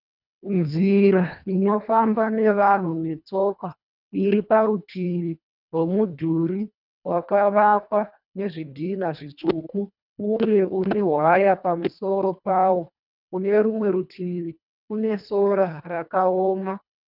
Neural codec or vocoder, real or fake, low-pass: codec, 24 kHz, 1.5 kbps, HILCodec; fake; 5.4 kHz